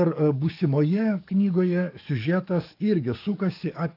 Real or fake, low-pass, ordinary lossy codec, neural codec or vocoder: fake; 5.4 kHz; AAC, 32 kbps; codec, 44.1 kHz, 7.8 kbps, DAC